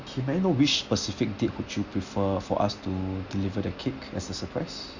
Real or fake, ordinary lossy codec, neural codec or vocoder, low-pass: real; Opus, 64 kbps; none; 7.2 kHz